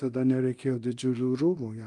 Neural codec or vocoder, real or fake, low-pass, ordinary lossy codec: codec, 24 kHz, 0.9 kbps, DualCodec; fake; 10.8 kHz; Opus, 32 kbps